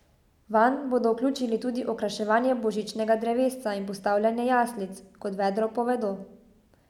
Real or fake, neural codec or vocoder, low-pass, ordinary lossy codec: real; none; 19.8 kHz; none